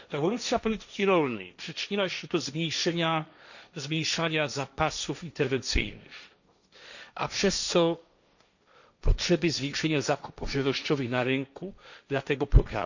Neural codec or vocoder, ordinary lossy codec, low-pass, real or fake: codec, 16 kHz, 1.1 kbps, Voila-Tokenizer; none; 7.2 kHz; fake